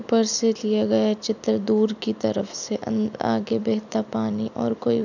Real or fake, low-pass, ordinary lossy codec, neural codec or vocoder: real; 7.2 kHz; none; none